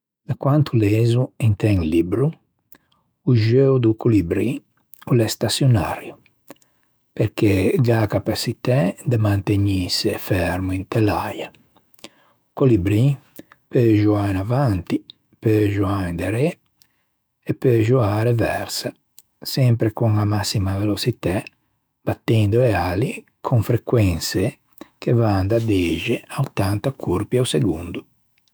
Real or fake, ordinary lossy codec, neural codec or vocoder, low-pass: fake; none; autoencoder, 48 kHz, 128 numbers a frame, DAC-VAE, trained on Japanese speech; none